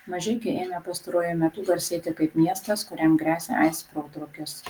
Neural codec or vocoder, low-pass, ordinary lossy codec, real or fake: none; 19.8 kHz; Opus, 24 kbps; real